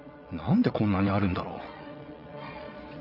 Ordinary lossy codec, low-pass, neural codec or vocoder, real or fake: none; 5.4 kHz; vocoder, 22.05 kHz, 80 mel bands, WaveNeXt; fake